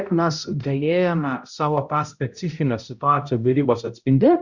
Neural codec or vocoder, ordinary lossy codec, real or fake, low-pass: codec, 16 kHz, 0.5 kbps, X-Codec, HuBERT features, trained on balanced general audio; Opus, 64 kbps; fake; 7.2 kHz